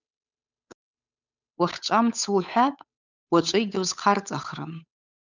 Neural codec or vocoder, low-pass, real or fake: codec, 16 kHz, 8 kbps, FunCodec, trained on Chinese and English, 25 frames a second; 7.2 kHz; fake